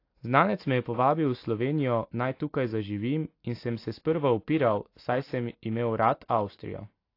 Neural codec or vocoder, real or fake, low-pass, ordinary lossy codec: none; real; 5.4 kHz; AAC, 32 kbps